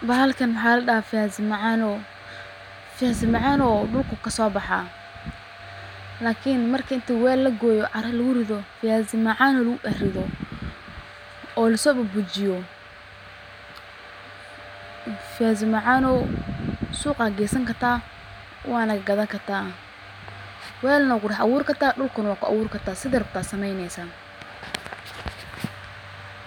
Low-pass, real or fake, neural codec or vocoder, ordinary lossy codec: 19.8 kHz; real; none; none